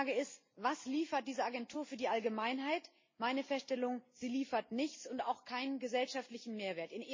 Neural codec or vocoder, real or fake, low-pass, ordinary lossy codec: none; real; 7.2 kHz; MP3, 32 kbps